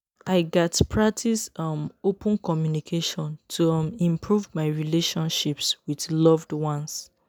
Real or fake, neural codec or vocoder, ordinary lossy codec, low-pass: real; none; none; none